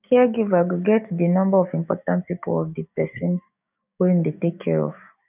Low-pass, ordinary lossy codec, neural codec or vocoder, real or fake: 3.6 kHz; none; codec, 16 kHz, 6 kbps, DAC; fake